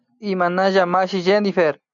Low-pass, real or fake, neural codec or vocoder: 7.2 kHz; real; none